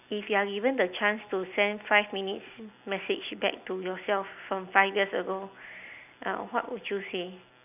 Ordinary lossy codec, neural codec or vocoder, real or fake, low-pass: none; none; real; 3.6 kHz